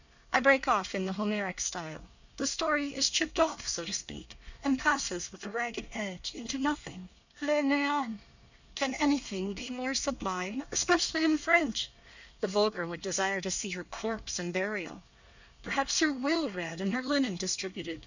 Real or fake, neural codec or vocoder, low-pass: fake; codec, 24 kHz, 1 kbps, SNAC; 7.2 kHz